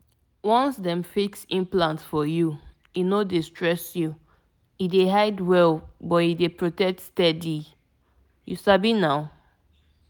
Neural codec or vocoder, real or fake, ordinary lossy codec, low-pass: none; real; none; none